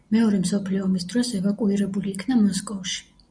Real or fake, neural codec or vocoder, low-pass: real; none; 9.9 kHz